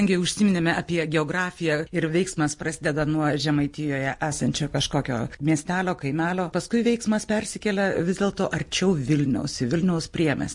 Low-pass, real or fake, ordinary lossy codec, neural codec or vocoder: 10.8 kHz; real; MP3, 48 kbps; none